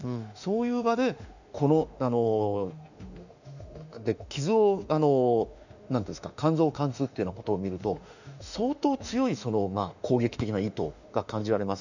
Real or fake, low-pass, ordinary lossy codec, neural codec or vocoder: fake; 7.2 kHz; none; autoencoder, 48 kHz, 32 numbers a frame, DAC-VAE, trained on Japanese speech